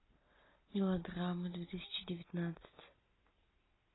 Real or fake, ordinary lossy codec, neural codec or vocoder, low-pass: real; AAC, 16 kbps; none; 7.2 kHz